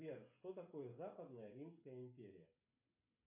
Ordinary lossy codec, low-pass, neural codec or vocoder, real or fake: AAC, 32 kbps; 3.6 kHz; codec, 16 kHz, 16 kbps, FreqCodec, smaller model; fake